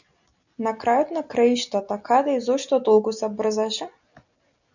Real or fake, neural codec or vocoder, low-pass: real; none; 7.2 kHz